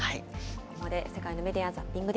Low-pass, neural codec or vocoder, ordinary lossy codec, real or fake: none; none; none; real